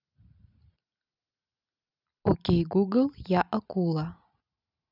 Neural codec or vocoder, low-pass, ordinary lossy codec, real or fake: none; 5.4 kHz; none; real